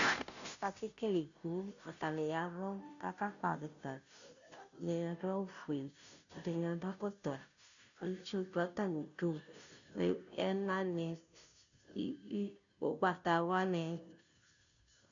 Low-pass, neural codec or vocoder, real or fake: 7.2 kHz; codec, 16 kHz, 0.5 kbps, FunCodec, trained on Chinese and English, 25 frames a second; fake